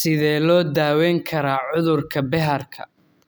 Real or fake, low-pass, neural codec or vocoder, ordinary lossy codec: real; none; none; none